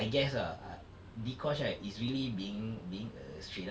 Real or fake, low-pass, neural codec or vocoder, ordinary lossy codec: real; none; none; none